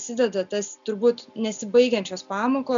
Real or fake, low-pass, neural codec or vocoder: real; 7.2 kHz; none